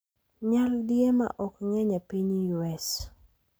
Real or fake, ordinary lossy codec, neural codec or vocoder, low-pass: real; none; none; none